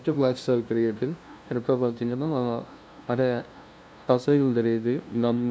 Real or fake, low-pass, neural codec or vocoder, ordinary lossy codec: fake; none; codec, 16 kHz, 0.5 kbps, FunCodec, trained on LibriTTS, 25 frames a second; none